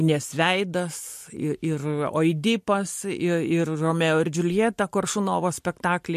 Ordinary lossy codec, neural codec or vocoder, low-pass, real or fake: MP3, 64 kbps; none; 14.4 kHz; real